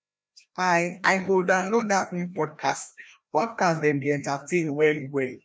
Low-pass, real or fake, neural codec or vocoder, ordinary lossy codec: none; fake; codec, 16 kHz, 1 kbps, FreqCodec, larger model; none